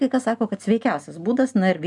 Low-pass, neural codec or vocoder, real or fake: 10.8 kHz; none; real